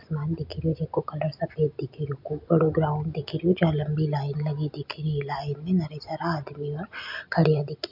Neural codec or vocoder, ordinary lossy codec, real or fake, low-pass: none; MP3, 48 kbps; real; 5.4 kHz